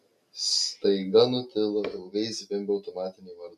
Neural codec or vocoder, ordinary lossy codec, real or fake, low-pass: none; MP3, 64 kbps; real; 14.4 kHz